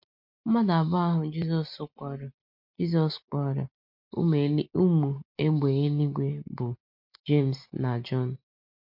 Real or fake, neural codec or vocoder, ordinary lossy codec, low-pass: real; none; MP3, 48 kbps; 5.4 kHz